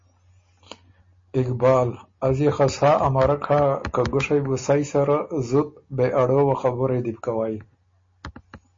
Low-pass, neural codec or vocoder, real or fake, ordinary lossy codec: 7.2 kHz; none; real; MP3, 32 kbps